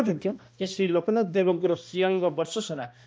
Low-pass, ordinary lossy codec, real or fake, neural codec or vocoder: none; none; fake; codec, 16 kHz, 1 kbps, X-Codec, HuBERT features, trained on balanced general audio